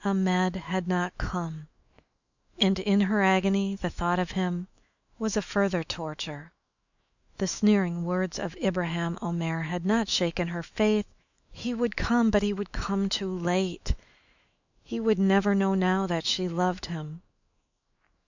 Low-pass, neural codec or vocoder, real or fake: 7.2 kHz; autoencoder, 48 kHz, 128 numbers a frame, DAC-VAE, trained on Japanese speech; fake